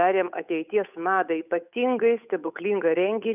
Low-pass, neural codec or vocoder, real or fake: 3.6 kHz; codec, 16 kHz, 8 kbps, FunCodec, trained on Chinese and English, 25 frames a second; fake